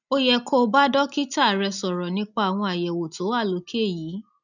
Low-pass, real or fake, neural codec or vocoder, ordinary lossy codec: 7.2 kHz; real; none; none